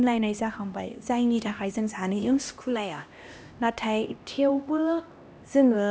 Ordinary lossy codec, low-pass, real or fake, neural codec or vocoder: none; none; fake; codec, 16 kHz, 1 kbps, X-Codec, HuBERT features, trained on LibriSpeech